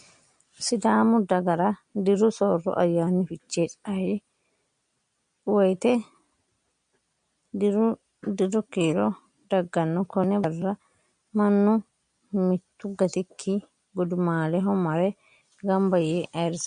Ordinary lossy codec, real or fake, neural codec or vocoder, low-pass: MP3, 48 kbps; real; none; 9.9 kHz